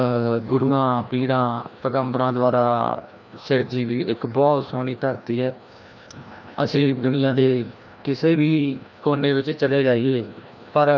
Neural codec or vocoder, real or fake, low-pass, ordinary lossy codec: codec, 16 kHz, 1 kbps, FreqCodec, larger model; fake; 7.2 kHz; none